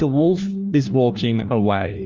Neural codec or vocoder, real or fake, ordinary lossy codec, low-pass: codec, 16 kHz, 1 kbps, FunCodec, trained on LibriTTS, 50 frames a second; fake; Opus, 32 kbps; 7.2 kHz